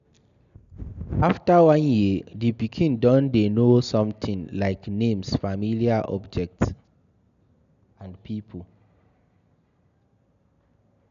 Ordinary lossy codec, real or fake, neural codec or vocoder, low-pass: none; real; none; 7.2 kHz